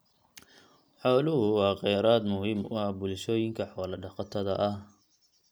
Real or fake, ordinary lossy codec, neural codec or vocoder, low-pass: real; none; none; none